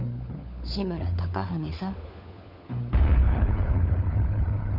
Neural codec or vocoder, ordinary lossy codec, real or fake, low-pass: codec, 16 kHz, 4 kbps, FunCodec, trained on LibriTTS, 50 frames a second; none; fake; 5.4 kHz